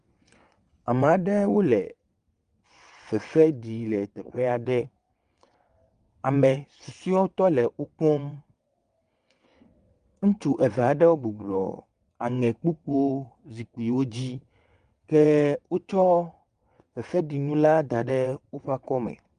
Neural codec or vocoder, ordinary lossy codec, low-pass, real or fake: vocoder, 22.05 kHz, 80 mel bands, WaveNeXt; Opus, 32 kbps; 9.9 kHz; fake